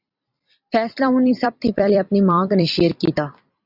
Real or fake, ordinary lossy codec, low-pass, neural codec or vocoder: fake; Opus, 64 kbps; 5.4 kHz; vocoder, 44.1 kHz, 128 mel bands every 256 samples, BigVGAN v2